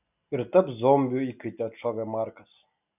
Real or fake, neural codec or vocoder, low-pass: real; none; 3.6 kHz